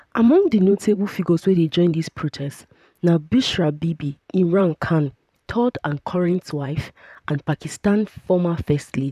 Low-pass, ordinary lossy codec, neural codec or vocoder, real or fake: 14.4 kHz; none; vocoder, 44.1 kHz, 128 mel bands, Pupu-Vocoder; fake